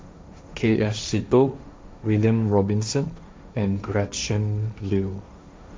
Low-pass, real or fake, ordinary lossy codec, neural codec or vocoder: none; fake; none; codec, 16 kHz, 1.1 kbps, Voila-Tokenizer